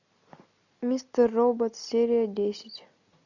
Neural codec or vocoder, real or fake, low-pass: none; real; 7.2 kHz